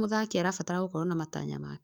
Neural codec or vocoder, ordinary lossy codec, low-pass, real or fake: codec, 44.1 kHz, 7.8 kbps, DAC; none; none; fake